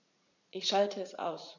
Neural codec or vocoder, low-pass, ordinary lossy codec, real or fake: vocoder, 44.1 kHz, 80 mel bands, Vocos; 7.2 kHz; none; fake